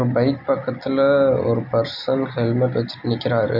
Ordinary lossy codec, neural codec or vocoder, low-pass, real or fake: none; none; 5.4 kHz; real